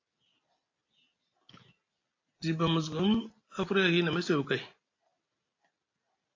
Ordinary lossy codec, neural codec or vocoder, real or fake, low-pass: AAC, 32 kbps; none; real; 7.2 kHz